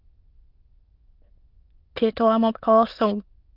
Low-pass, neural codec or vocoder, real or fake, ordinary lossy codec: 5.4 kHz; autoencoder, 22.05 kHz, a latent of 192 numbers a frame, VITS, trained on many speakers; fake; Opus, 24 kbps